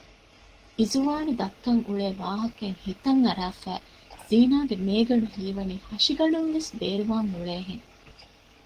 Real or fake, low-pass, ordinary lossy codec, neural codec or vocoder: fake; 14.4 kHz; Opus, 16 kbps; codec, 44.1 kHz, 7.8 kbps, DAC